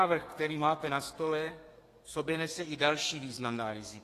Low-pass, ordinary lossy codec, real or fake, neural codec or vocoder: 14.4 kHz; AAC, 48 kbps; fake; codec, 32 kHz, 1.9 kbps, SNAC